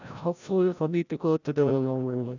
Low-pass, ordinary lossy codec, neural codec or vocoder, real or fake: 7.2 kHz; none; codec, 16 kHz, 0.5 kbps, FreqCodec, larger model; fake